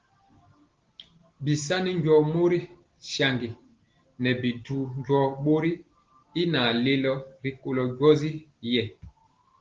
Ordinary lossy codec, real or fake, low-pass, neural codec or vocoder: Opus, 16 kbps; real; 7.2 kHz; none